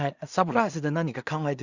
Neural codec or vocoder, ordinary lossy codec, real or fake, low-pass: codec, 16 kHz in and 24 kHz out, 0.4 kbps, LongCat-Audio-Codec, two codebook decoder; Opus, 64 kbps; fake; 7.2 kHz